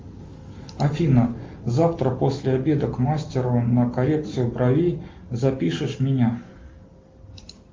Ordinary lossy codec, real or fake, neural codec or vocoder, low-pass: Opus, 32 kbps; real; none; 7.2 kHz